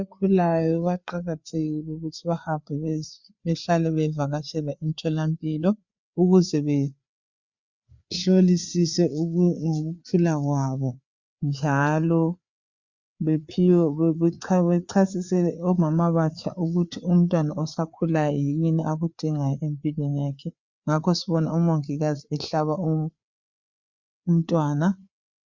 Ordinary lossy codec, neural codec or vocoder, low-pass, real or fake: Opus, 64 kbps; codec, 16 kHz, 4 kbps, FreqCodec, larger model; 7.2 kHz; fake